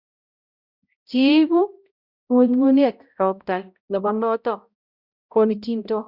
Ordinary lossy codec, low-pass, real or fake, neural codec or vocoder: Opus, 64 kbps; 5.4 kHz; fake; codec, 16 kHz, 0.5 kbps, X-Codec, HuBERT features, trained on balanced general audio